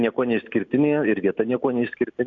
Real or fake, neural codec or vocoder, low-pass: real; none; 7.2 kHz